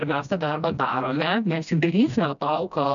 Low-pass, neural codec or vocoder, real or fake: 7.2 kHz; codec, 16 kHz, 1 kbps, FreqCodec, smaller model; fake